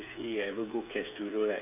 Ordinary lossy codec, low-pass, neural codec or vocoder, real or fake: none; 3.6 kHz; codec, 16 kHz, 8 kbps, FreqCodec, smaller model; fake